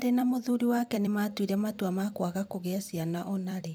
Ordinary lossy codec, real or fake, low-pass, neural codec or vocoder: none; fake; none; vocoder, 44.1 kHz, 128 mel bands every 256 samples, BigVGAN v2